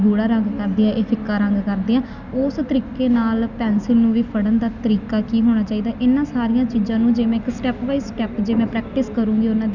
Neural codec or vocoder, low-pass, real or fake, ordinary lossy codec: none; 7.2 kHz; real; none